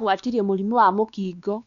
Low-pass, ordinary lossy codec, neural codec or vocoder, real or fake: 7.2 kHz; none; codec, 16 kHz, 2 kbps, X-Codec, WavLM features, trained on Multilingual LibriSpeech; fake